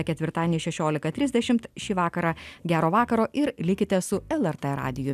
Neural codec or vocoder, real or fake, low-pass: none; real; 14.4 kHz